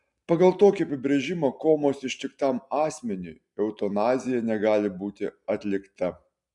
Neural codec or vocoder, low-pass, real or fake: none; 10.8 kHz; real